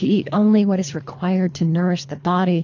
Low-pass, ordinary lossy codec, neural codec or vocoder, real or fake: 7.2 kHz; AAC, 48 kbps; codec, 24 kHz, 3 kbps, HILCodec; fake